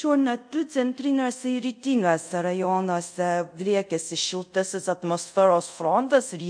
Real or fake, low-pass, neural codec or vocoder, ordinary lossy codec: fake; 9.9 kHz; codec, 24 kHz, 0.5 kbps, DualCodec; MP3, 48 kbps